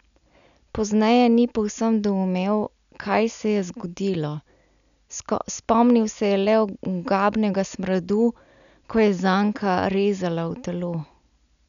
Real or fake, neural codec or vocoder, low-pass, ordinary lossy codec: real; none; 7.2 kHz; none